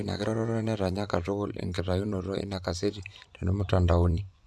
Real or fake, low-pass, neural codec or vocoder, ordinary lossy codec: fake; none; vocoder, 24 kHz, 100 mel bands, Vocos; none